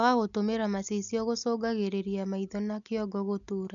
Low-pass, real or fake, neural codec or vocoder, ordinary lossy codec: 7.2 kHz; real; none; none